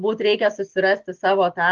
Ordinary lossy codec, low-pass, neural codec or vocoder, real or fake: Opus, 16 kbps; 7.2 kHz; none; real